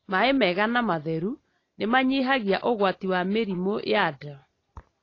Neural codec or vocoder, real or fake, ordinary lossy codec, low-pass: none; real; AAC, 32 kbps; 7.2 kHz